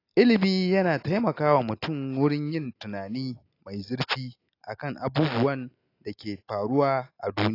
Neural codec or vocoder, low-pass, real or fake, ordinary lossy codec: none; 5.4 kHz; real; AAC, 32 kbps